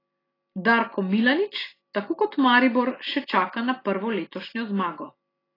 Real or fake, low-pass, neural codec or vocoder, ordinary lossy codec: real; 5.4 kHz; none; AAC, 24 kbps